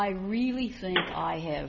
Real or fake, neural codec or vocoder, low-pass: real; none; 7.2 kHz